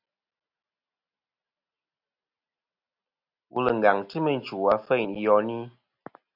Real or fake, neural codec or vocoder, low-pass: real; none; 5.4 kHz